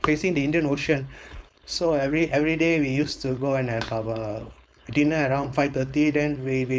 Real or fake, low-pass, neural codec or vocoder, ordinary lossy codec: fake; none; codec, 16 kHz, 4.8 kbps, FACodec; none